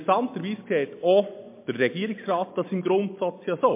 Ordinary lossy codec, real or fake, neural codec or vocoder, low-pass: MP3, 24 kbps; real; none; 3.6 kHz